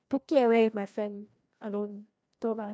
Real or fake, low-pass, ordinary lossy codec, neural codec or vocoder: fake; none; none; codec, 16 kHz, 1 kbps, FreqCodec, larger model